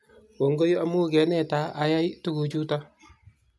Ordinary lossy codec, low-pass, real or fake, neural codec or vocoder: none; none; real; none